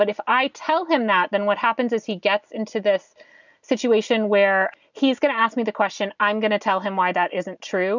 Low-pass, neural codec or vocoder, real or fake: 7.2 kHz; none; real